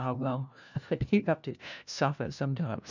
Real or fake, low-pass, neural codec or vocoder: fake; 7.2 kHz; codec, 16 kHz, 1 kbps, FunCodec, trained on LibriTTS, 50 frames a second